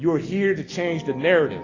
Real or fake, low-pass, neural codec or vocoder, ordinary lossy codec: real; 7.2 kHz; none; AAC, 32 kbps